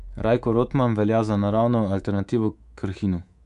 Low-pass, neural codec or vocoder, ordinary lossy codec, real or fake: 10.8 kHz; codec, 24 kHz, 3.1 kbps, DualCodec; AAC, 96 kbps; fake